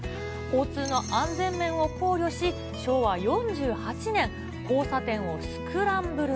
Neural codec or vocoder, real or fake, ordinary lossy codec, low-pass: none; real; none; none